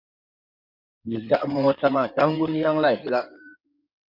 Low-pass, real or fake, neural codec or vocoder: 5.4 kHz; fake; codec, 16 kHz in and 24 kHz out, 2.2 kbps, FireRedTTS-2 codec